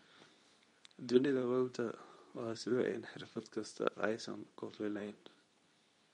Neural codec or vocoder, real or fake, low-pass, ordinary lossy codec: codec, 24 kHz, 0.9 kbps, WavTokenizer, medium speech release version 2; fake; 10.8 kHz; MP3, 48 kbps